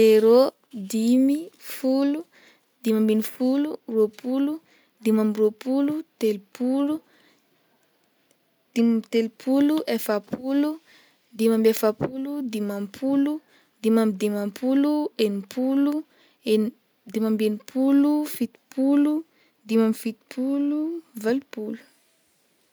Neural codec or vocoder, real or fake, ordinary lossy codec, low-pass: none; real; none; none